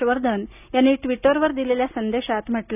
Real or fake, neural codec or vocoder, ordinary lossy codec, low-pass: real; none; none; 3.6 kHz